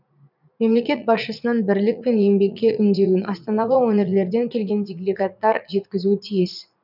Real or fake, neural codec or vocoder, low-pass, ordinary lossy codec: fake; vocoder, 44.1 kHz, 80 mel bands, Vocos; 5.4 kHz; none